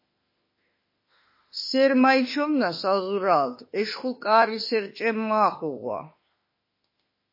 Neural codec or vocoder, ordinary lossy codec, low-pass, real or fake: autoencoder, 48 kHz, 32 numbers a frame, DAC-VAE, trained on Japanese speech; MP3, 24 kbps; 5.4 kHz; fake